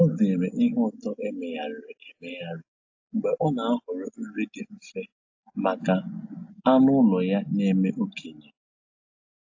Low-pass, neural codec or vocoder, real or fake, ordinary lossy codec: 7.2 kHz; none; real; AAC, 48 kbps